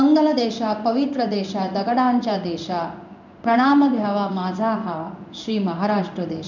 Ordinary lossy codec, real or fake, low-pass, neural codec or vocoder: none; fake; 7.2 kHz; codec, 16 kHz in and 24 kHz out, 1 kbps, XY-Tokenizer